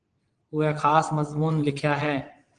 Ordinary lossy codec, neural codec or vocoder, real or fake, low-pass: Opus, 24 kbps; vocoder, 22.05 kHz, 80 mel bands, WaveNeXt; fake; 9.9 kHz